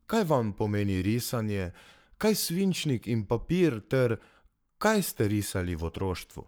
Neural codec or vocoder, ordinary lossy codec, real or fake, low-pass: codec, 44.1 kHz, 7.8 kbps, Pupu-Codec; none; fake; none